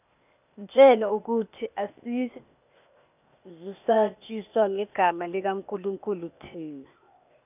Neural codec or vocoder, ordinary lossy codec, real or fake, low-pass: codec, 16 kHz, 0.8 kbps, ZipCodec; none; fake; 3.6 kHz